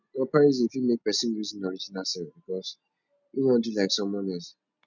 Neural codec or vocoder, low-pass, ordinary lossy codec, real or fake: none; 7.2 kHz; none; real